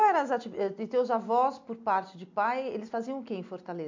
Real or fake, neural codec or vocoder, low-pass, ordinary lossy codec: real; none; 7.2 kHz; MP3, 64 kbps